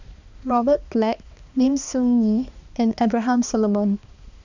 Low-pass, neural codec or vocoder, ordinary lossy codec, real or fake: 7.2 kHz; codec, 16 kHz, 2 kbps, X-Codec, HuBERT features, trained on balanced general audio; none; fake